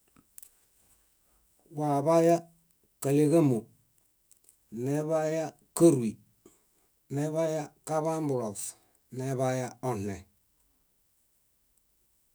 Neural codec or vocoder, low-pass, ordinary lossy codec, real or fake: autoencoder, 48 kHz, 128 numbers a frame, DAC-VAE, trained on Japanese speech; none; none; fake